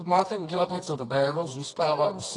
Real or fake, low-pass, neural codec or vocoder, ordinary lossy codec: fake; 10.8 kHz; codec, 24 kHz, 0.9 kbps, WavTokenizer, medium music audio release; AAC, 32 kbps